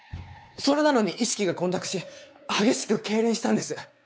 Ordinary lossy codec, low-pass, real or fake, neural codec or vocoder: none; none; fake; codec, 16 kHz, 4 kbps, X-Codec, WavLM features, trained on Multilingual LibriSpeech